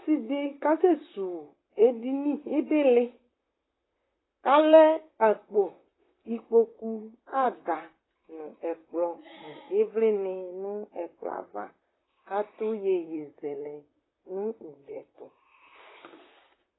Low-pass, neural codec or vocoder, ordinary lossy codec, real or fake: 7.2 kHz; vocoder, 44.1 kHz, 128 mel bands, Pupu-Vocoder; AAC, 16 kbps; fake